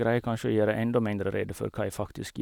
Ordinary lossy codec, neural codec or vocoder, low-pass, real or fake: none; none; 19.8 kHz; real